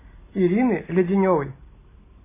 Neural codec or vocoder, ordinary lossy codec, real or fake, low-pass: none; MP3, 16 kbps; real; 3.6 kHz